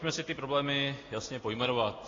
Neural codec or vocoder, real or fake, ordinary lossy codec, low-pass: none; real; AAC, 32 kbps; 7.2 kHz